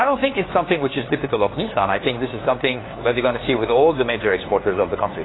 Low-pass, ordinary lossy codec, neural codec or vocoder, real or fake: 7.2 kHz; AAC, 16 kbps; codec, 16 kHz, 2 kbps, FunCodec, trained on LibriTTS, 25 frames a second; fake